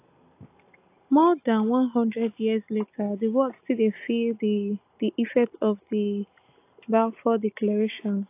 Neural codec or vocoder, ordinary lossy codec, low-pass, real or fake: none; AAC, 24 kbps; 3.6 kHz; real